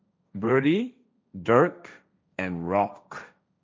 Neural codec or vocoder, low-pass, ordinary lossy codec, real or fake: codec, 16 kHz, 1.1 kbps, Voila-Tokenizer; none; none; fake